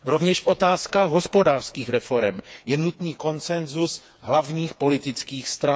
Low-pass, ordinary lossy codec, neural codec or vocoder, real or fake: none; none; codec, 16 kHz, 4 kbps, FreqCodec, smaller model; fake